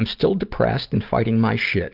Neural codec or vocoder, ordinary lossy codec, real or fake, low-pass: none; Opus, 32 kbps; real; 5.4 kHz